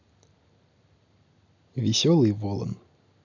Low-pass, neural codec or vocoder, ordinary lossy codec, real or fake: 7.2 kHz; none; none; real